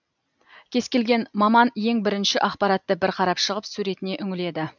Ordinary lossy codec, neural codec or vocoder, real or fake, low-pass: none; none; real; 7.2 kHz